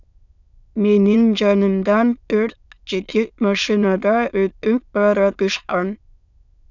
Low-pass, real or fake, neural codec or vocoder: 7.2 kHz; fake; autoencoder, 22.05 kHz, a latent of 192 numbers a frame, VITS, trained on many speakers